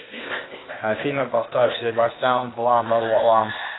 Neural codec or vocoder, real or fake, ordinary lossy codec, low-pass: codec, 16 kHz, 0.8 kbps, ZipCodec; fake; AAC, 16 kbps; 7.2 kHz